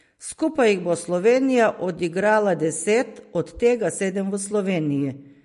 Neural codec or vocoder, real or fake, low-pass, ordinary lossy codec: none; real; 14.4 kHz; MP3, 48 kbps